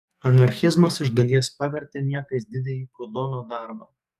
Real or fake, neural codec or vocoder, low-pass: fake; codec, 44.1 kHz, 2.6 kbps, SNAC; 14.4 kHz